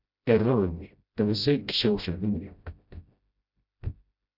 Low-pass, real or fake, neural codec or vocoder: 5.4 kHz; fake; codec, 16 kHz, 0.5 kbps, FreqCodec, smaller model